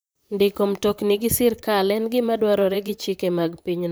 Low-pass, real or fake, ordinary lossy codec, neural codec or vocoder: none; fake; none; vocoder, 44.1 kHz, 128 mel bands, Pupu-Vocoder